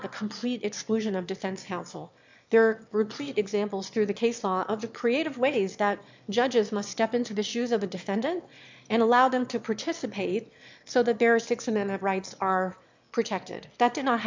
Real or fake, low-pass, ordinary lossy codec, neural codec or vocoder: fake; 7.2 kHz; MP3, 64 kbps; autoencoder, 22.05 kHz, a latent of 192 numbers a frame, VITS, trained on one speaker